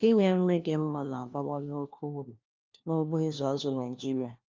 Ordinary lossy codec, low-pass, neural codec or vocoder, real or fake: Opus, 32 kbps; 7.2 kHz; codec, 16 kHz, 1 kbps, FunCodec, trained on LibriTTS, 50 frames a second; fake